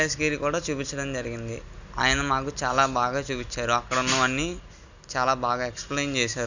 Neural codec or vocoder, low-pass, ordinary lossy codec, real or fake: none; 7.2 kHz; none; real